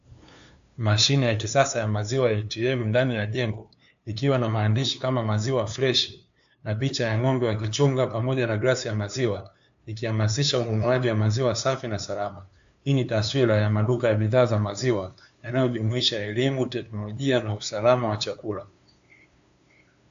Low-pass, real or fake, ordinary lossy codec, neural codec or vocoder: 7.2 kHz; fake; AAC, 64 kbps; codec, 16 kHz, 2 kbps, FunCodec, trained on LibriTTS, 25 frames a second